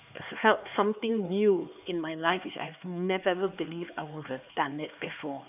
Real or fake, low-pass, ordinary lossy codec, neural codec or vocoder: fake; 3.6 kHz; none; codec, 16 kHz, 4 kbps, X-Codec, HuBERT features, trained on LibriSpeech